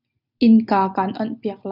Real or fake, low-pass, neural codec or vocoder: real; 5.4 kHz; none